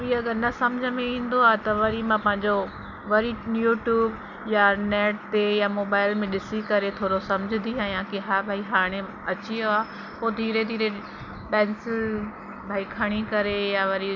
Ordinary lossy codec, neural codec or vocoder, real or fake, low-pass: none; none; real; 7.2 kHz